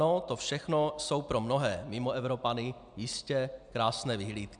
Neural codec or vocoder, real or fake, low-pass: none; real; 9.9 kHz